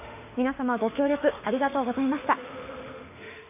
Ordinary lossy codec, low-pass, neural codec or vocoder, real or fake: none; 3.6 kHz; autoencoder, 48 kHz, 32 numbers a frame, DAC-VAE, trained on Japanese speech; fake